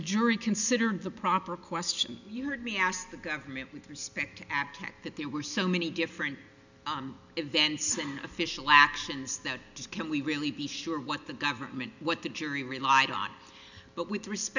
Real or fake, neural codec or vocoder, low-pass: real; none; 7.2 kHz